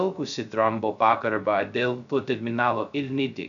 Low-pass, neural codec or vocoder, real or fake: 7.2 kHz; codec, 16 kHz, 0.2 kbps, FocalCodec; fake